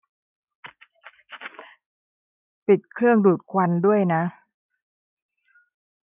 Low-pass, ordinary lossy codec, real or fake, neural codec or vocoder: 3.6 kHz; none; real; none